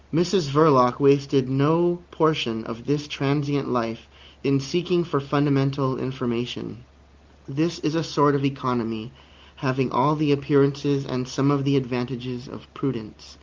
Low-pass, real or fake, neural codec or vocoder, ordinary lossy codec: 7.2 kHz; real; none; Opus, 32 kbps